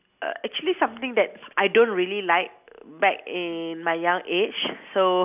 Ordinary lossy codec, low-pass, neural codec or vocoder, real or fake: none; 3.6 kHz; none; real